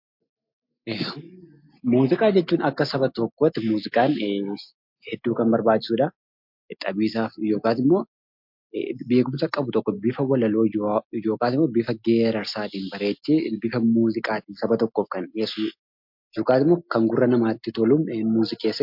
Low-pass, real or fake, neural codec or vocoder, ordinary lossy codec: 5.4 kHz; real; none; MP3, 48 kbps